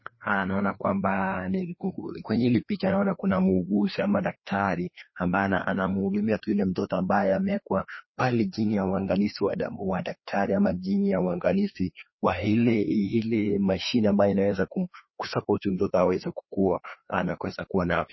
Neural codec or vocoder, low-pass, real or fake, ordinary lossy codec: codec, 16 kHz, 2 kbps, FreqCodec, larger model; 7.2 kHz; fake; MP3, 24 kbps